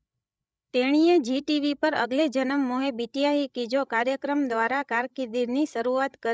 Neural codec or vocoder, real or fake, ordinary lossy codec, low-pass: codec, 16 kHz, 16 kbps, FreqCodec, larger model; fake; none; none